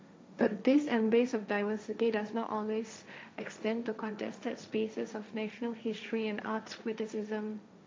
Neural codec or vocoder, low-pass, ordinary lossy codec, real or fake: codec, 16 kHz, 1.1 kbps, Voila-Tokenizer; none; none; fake